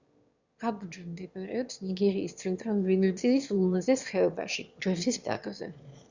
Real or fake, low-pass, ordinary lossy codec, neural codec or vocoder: fake; 7.2 kHz; Opus, 64 kbps; autoencoder, 22.05 kHz, a latent of 192 numbers a frame, VITS, trained on one speaker